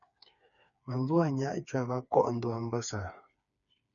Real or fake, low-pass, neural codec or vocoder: fake; 7.2 kHz; codec, 16 kHz, 4 kbps, FreqCodec, smaller model